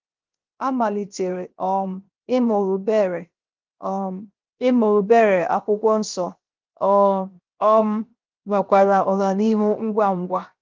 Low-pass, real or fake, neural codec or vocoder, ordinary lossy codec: 7.2 kHz; fake; codec, 16 kHz, 0.7 kbps, FocalCodec; Opus, 32 kbps